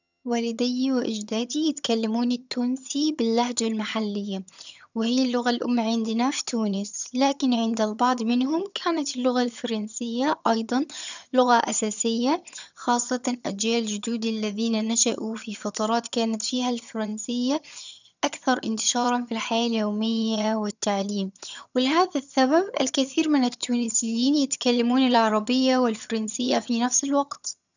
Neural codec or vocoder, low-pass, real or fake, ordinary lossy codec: vocoder, 22.05 kHz, 80 mel bands, HiFi-GAN; 7.2 kHz; fake; none